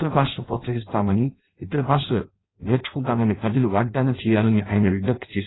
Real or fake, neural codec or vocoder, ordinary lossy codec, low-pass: fake; codec, 16 kHz in and 24 kHz out, 0.6 kbps, FireRedTTS-2 codec; AAC, 16 kbps; 7.2 kHz